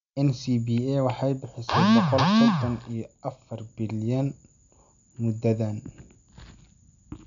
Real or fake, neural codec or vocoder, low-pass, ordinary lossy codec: real; none; 7.2 kHz; MP3, 96 kbps